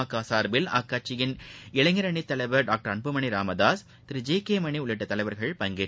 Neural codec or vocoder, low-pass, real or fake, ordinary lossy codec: none; none; real; none